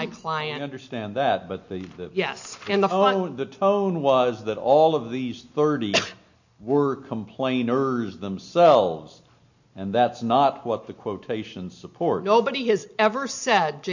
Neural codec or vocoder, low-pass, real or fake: none; 7.2 kHz; real